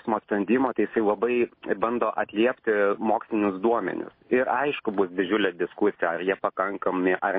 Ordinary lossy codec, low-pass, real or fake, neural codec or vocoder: MP3, 24 kbps; 5.4 kHz; real; none